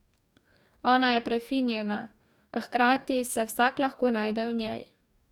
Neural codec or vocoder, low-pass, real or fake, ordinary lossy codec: codec, 44.1 kHz, 2.6 kbps, DAC; 19.8 kHz; fake; none